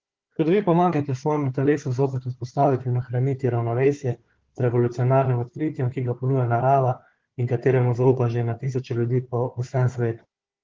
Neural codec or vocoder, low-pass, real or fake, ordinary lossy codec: codec, 16 kHz, 4 kbps, FunCodec, trained on Chinese and English, 50 frames a second; 7.2 kHz; fake; Opus, 16 kbps